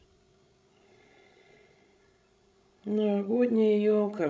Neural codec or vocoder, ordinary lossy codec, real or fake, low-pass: codec, 16 kHz, 16 kbps, FreqCodec, larger model; none; fake; none